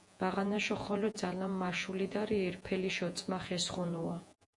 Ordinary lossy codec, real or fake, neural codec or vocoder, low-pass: MP3, 96 kbps; fake; vocoder, 48 kHz, 128 mel bands, Vocos; 10.8 kHz